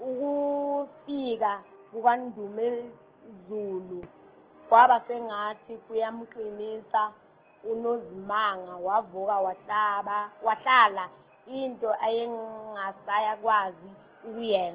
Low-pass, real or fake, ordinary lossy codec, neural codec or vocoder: 3.6 kHz; real; Opus, 16 kbps; none